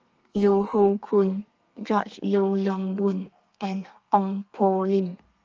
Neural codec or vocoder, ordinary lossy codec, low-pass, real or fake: codec, 32 kHz, 1.9 kbps, SNAC; Opus, 24 kbps; 7.2 kHz; fake